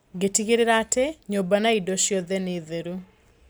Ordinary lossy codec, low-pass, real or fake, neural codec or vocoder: none; none; real; none